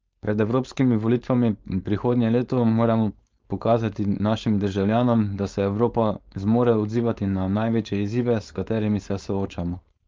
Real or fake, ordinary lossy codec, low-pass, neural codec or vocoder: fake; Opus, 32 kbps; 7.2 kHz; codec, 16 kHz, 4.8 kbps, FACodec